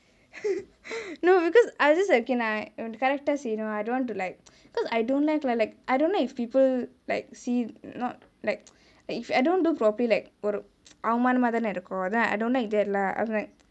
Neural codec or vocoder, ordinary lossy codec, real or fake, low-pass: none; none; real; none